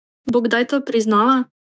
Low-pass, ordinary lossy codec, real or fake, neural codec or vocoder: none; none; fake; codec, 16 kHz, 6 kbps, DAC